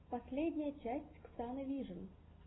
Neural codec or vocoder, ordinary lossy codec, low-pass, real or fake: none; AAC, 16 kbps; 7.2 kHz; real